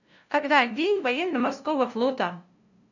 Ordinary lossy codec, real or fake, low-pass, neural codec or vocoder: none; fake; 7.2 kHz; codec, 16 kHz, 0.5 kbps, FunCodec, trained on LibriTTS, 25 frames a second